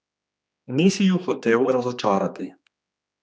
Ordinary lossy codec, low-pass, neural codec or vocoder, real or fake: none; none; codec, 16 kHz, 2 kbps, X-Codec, HuBERT features, trained on general audio; fake